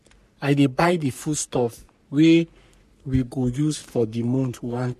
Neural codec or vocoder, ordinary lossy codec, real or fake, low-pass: codec, 44.1 kHz, 3.4 kbps, Pupu-Codec; MP3, 64 kbps; fake; 14.4 kHz